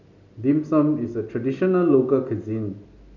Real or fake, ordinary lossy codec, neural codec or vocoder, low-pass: real; none; none; 7.2 kHz